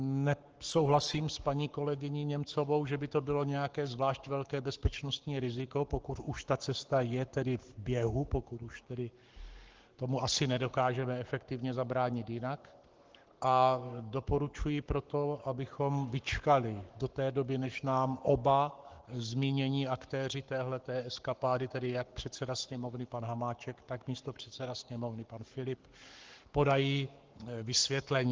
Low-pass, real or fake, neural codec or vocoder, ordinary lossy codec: 7.2 kHz; fake; codec, 44.1 kHz, 7.8 kbps, Pupu-Codec; Opus, 16 kbps